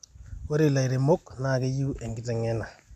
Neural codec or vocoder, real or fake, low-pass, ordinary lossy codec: none; real; 14.4 kHz; MP3, 96 kbps